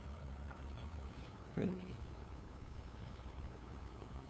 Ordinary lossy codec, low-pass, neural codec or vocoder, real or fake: none; none; codec, 16 kHz, 2 kbps, FunCodec, trained on LibriTTS, 25 frames a second; fake